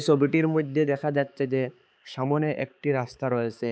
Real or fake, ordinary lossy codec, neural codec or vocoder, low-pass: fake; none; codec, 16 kHz, 4 kbps, X-Codec, HuBERT features, trained on balanced general audio; none